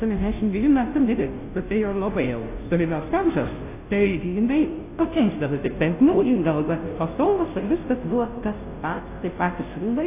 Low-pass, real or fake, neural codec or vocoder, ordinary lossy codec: 3.6 kHz; fake; codec, 16 kHz, 0.5 kbps, FunCodec, trained on Chinese and English, 25 frames a second; AAC, 24 kbps